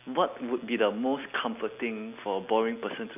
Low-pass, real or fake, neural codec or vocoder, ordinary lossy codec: 3.6 kHz; real; none; AAC, 32 kbps